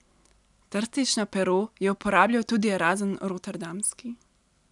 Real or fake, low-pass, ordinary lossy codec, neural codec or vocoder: real; 10.8 kHz; none; none